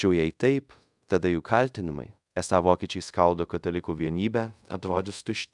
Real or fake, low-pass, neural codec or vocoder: fake; 10.8 kHz; codec, 24 kHz, 0.5 kbps, DualCodec